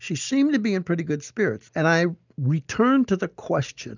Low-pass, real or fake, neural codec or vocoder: 7.2 kHz; real; none